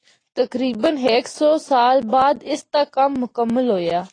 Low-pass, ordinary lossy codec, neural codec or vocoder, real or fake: 9.9 kHz; AAC, 32 kbps; none; real